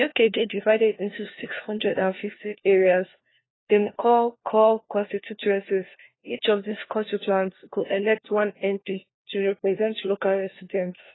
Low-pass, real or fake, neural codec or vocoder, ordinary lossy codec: 7.2 kHz; fake; codec, 16 kHz, 1 kbps, FunCodec, trained on LibriTTS, 50 frames a second; AAC, 16 kbps